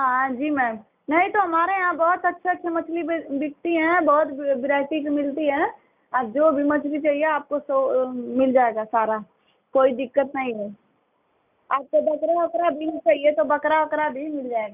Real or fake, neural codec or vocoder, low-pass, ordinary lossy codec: real; none; 3.6 kHz; none